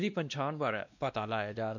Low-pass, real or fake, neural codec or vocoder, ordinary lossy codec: 7.2 kHz; fake; codec, 16 kHz, 1 kbps, X-Codec, WavLM features, trained on Multilingual LibriSpeech; none